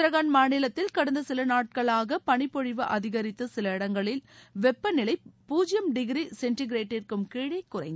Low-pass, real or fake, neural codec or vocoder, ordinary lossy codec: none; real; none; none